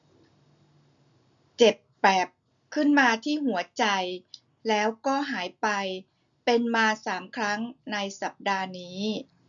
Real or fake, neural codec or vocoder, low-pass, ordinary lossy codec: real; none; 7.2 kHz; none